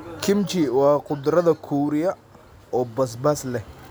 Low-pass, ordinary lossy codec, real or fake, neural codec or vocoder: none; none; real; none